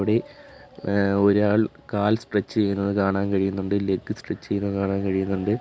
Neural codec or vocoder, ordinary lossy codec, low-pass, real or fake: none; none; none; real